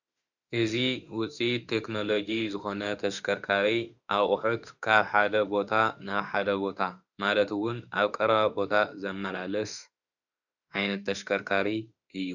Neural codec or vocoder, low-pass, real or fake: autoencoder, 48 kHz, 32 numbers a frame, DAC-VAE, trained on Japanese speech; 7.2 kHz; fake